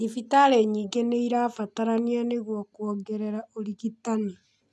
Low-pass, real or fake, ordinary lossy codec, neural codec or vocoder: none; real; none; none